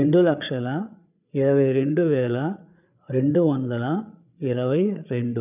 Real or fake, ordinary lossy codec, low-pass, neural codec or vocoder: fake; none; 3.6 kHz; codec, 16 kHz, 8 kbps, FreqCodec, larger model